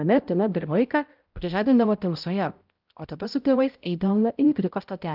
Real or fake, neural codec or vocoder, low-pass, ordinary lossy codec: fake; codec, 16 kHz, 0.5 kbps, X-Codec, HuBERT features, trained on balanced general audio; 5.4 kHz; Opus, 32 kbps